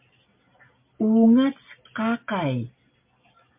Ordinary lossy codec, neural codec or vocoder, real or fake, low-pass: MP3, 32 kbps; none; real; 3.6 kHz